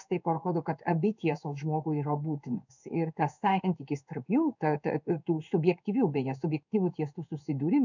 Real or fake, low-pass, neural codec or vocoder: fake; 7.2 kHz; codec, 16 kHz in and 24 kHz out, 1 kbps, XY-Tokenizer